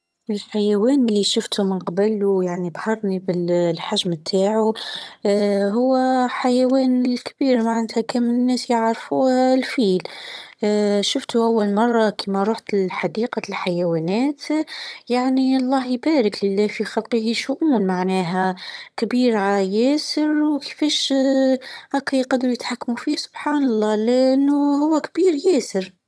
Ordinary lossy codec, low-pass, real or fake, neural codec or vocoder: none; none; fake; vocoder, 22.05 kHz, 80 mel bands, HiFi-GAN